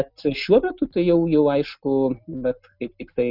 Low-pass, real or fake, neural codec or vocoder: 5.4 kHz; real; none